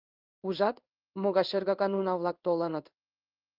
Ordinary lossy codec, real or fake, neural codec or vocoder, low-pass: Opus, 24 kbps; fake; codec, 16 kHz in and 24 kHz out, 1 kbps, XY-Tokenizer; 5.4 kHz